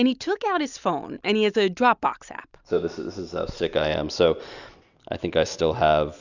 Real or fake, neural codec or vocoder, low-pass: real; none; 7.2 kHz